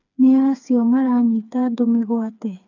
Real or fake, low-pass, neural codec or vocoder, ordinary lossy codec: fake; 7.2 kHz; codec, 16 kHz, 4 kbps, FreqCodec, smaller model; none